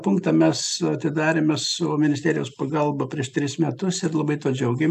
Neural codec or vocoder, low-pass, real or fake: none; 14.4 kHz; real